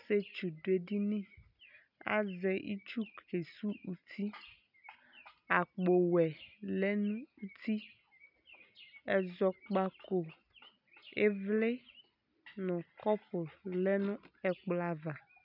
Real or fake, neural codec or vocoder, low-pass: real; none; 5.4 kHz